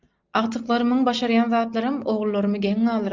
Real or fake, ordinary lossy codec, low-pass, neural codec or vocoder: real; Opus, 24 kbps; 7.2 kHz; none